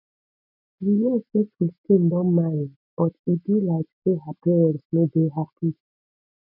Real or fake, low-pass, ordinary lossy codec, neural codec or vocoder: real; 5.4 kHz; none; none